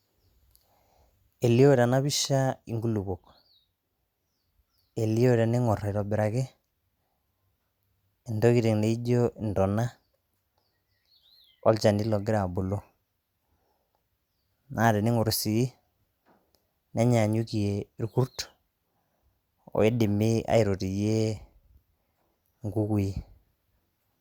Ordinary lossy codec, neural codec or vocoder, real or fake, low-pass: Opus, 64 kbps; none; real; 19.8 kHz